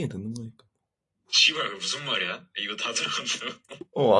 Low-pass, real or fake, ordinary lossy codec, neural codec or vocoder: 10.8 kHz; real; AAC, 48 kbps; none